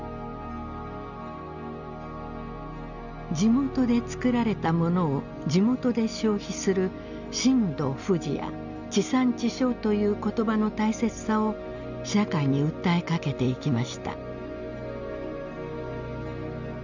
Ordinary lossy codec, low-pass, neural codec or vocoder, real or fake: none; 7.2 kHz; none; real